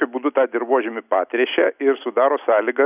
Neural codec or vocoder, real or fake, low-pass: none; real; 3.6 kHz